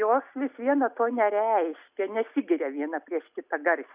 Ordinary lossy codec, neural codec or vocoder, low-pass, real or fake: Opus, 64 kbps; none; 3.6 kHz; real